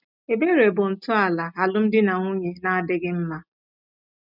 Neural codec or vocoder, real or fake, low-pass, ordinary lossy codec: none; real; 5.4 kHz; none